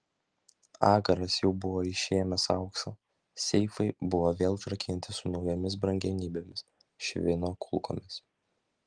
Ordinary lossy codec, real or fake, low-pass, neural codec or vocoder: Opus, 24 kbps; real; 9.9 kHz; none